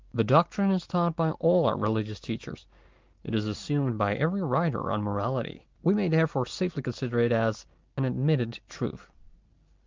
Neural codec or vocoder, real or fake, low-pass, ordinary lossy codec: none; real; 7.2 kHz; Opus, 24 kbps